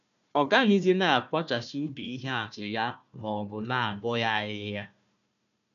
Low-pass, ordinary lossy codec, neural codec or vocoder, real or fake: 7.2 kHz; none; codec, 16 kHz, 1 kbps, FunCodec, trained on Chinese and English, 50 frames a second; fake